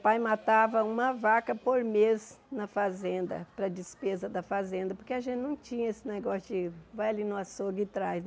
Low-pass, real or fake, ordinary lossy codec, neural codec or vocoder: none; real; none; none